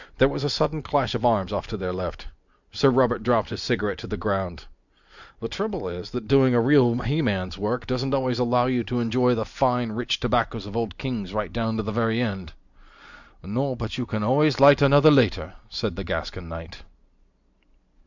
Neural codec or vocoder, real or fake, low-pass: none; real; 7.2 kHz